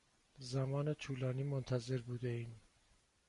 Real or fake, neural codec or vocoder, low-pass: real; none; 10.8 kHz